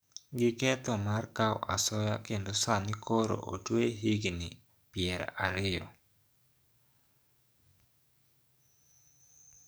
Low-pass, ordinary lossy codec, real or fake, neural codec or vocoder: none; none; fake; codec, 44.1 kHz, 7.8 kbps, DAC